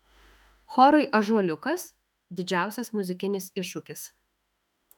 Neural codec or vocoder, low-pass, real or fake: autoencoder, 48 kHz, 32 numbers a frame, DAC-VAE, trained on Japanese speech; 19.8 kHz; fake